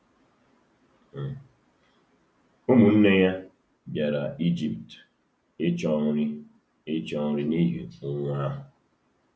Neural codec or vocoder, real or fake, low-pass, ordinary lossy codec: none; real; none; none